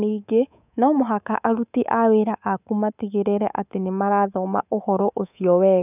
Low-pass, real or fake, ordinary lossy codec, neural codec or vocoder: 3.6 kHz; fake; none; codec, 24 kHz, 3.1 kbps, DualCodec